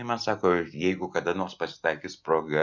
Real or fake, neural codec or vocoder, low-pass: real; none; 7.2 kHz